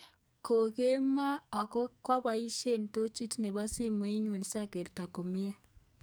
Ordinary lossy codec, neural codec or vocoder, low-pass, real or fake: none; codec, 44.1 kHz, 2.6 kbps, SNAC; none; fake